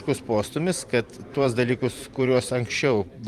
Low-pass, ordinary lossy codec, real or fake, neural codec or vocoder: 14.4 kHz; Opus, 32 kbps; fake; vocoder, 44.1 kHz, 128 mel bands every 512 samples, BigVGAN v2